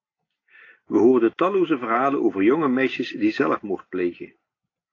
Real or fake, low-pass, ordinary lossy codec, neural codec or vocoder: real; 7.2 kHz; AAC, 32 kbps; none